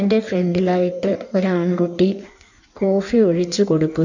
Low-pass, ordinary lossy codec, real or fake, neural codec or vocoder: 7.2 kHz; none; fake; codec, 16 kHz in and 24 kHz out, 1.1 kbps, FireRedTTS-2 codec